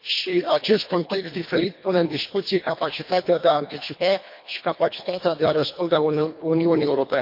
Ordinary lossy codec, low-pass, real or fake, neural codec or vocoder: MP3, 32 kbps; 5.4 kHz; fake; codec, 24 kHz, 1.5 kbps, HILCodec